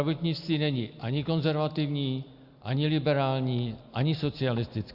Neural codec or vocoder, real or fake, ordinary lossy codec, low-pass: none; real; Opus, 64 kbps; 5.4 kHz